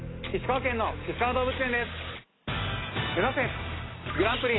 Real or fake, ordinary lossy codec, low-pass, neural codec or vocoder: real; AAC, 16 kbps; 7.2 kHz; none